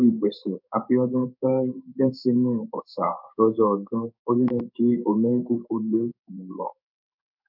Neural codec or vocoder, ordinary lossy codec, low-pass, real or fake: codec, 16 kHz in and 24 kHz out, 1 kbps, XY-Tokenizer; none; 5.4 kHz; fake